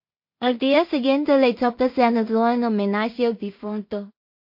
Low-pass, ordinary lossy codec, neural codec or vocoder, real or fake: 5.4 kHz; MP3, 32 kbps; codec, 16 kHz in and 24 kHz out, 0.4 kbps, LongCat-Audio-Codec, two codebook decoder; fake